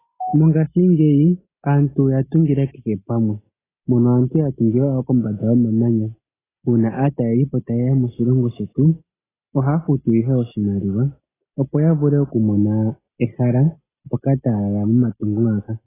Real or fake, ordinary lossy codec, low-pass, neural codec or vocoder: real; AAC, 16 kbps; 3.6 kHz; none